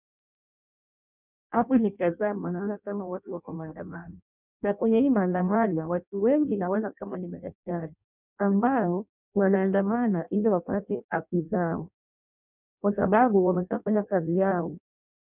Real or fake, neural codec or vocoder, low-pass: fake; codec, 16 kHz in and 24 kHz out, 0.6 kbps, FireRedTTS-2 codec; 3.6 kHz